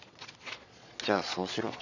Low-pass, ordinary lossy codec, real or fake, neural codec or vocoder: 7.2 kHz; none; fake; vocoder, 22.05 kHz, 80 mel bands, Vocos